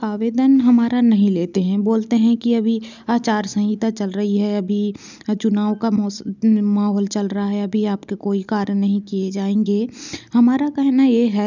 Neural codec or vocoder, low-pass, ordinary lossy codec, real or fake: none; 7.2 kHz; none; real